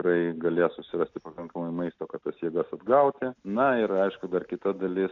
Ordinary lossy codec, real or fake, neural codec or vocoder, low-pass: AAC, 48 kbps; real; none; 7.2 kHz